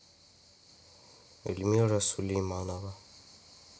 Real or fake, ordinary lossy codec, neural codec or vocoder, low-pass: real; none; none; none